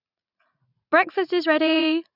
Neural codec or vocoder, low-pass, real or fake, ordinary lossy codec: vocoder, 44.1 kHz, 80 mel bands, Vocos; 5.4 kHz; fake; none